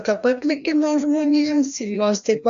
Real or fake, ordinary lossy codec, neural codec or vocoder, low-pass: fake; AAC, 64 kbps; codec, 16 kHz, 1 kbps, FreqCodec, larger model; 7.2 kHz